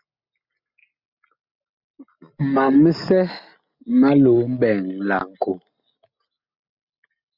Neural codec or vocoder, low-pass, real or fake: none; 5.4 kHz; real